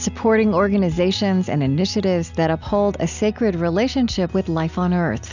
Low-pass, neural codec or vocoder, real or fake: 7.2 kHz; none; real